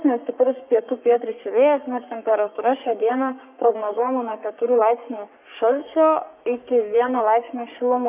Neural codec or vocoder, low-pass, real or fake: codec, 44.1 kHz, 3.4 kbps, Pupu-Codec; 3.6 kHz; fake